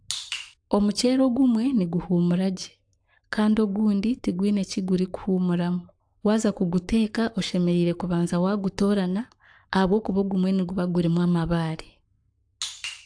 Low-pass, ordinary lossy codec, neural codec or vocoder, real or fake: 9.9 kHz; AAC, 64 kbps; codec, 44.1 kHz, 7.8 kbps, Pupu-Codec; fake